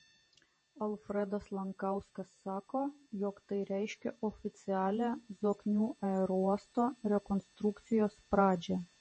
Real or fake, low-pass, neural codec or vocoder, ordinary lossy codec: fake; 9.9 kHz; vocoder, 48 kHz, 128 mel bands, Vocos; MP3, 32 kbps